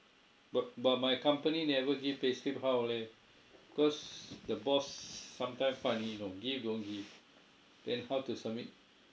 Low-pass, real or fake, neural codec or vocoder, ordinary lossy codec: none; real; none; none